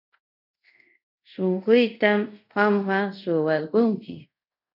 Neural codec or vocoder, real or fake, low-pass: codec, 24 kHz, 0.5 kbps, DualCodec; fake; 5.4 kHz